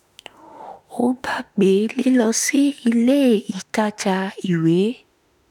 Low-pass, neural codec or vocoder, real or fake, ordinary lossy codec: 19.8 kHz; autoencoder, 48 kHz, 32 numbers a frame, DAC-VAE, trained on Japanese speech; fake; none